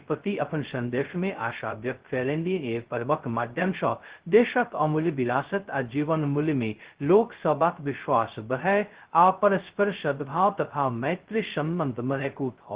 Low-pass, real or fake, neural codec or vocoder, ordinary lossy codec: 3.6 kHz; fake; codec, 16 kHz, 0.2 kbps, FocalCodec; Opus, 16 kbps